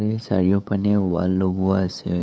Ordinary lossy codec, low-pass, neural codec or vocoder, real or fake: none; none; codec, 16 kHz, 8 kbps, FunCodec, trained on LibriTTS, 25 frames a second; fake